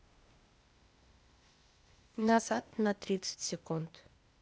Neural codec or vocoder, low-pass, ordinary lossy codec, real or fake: codec, 16 kHz, 0.8 kbps, ZipCodec; none; none; fake